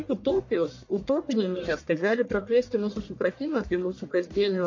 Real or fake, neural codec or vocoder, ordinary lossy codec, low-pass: fake; codec, 44.1 kHz, 1.7 kbps, Pupu-Codec; MP3, 48 kbps; 7.2 kHz